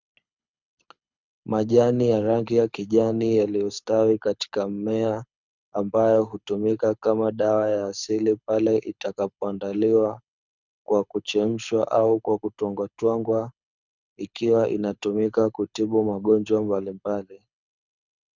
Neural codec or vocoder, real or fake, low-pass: codec, 24 kHz, 6 kbps, HILCodec; fake; 7.2 kHz